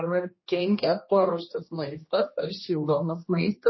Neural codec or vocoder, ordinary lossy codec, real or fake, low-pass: codec, 16 kHz, 1 kbps, X-Codec, HuBERT features, trained on general audio; MP3, 24 kbps; fake; 7.2 kHz